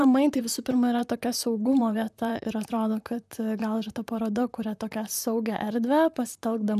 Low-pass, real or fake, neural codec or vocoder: 14.4 kHz; fake; vocoder, 44.1 kHz, 128 mel bands, Pupu-Vocoder